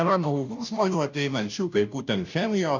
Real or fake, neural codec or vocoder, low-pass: fake; codec, 16 kHz, 0.5 kbps, FunCodec, trained on Chinese and English, 25 frames a second; 7.2 kHz